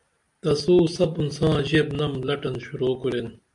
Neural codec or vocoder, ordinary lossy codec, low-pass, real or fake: none; MP3, 64 kbps; 10.8 kHz; real